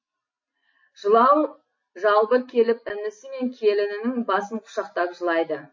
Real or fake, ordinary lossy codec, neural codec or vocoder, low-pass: real; MP3, 32 kbps; none; 7.2 kHz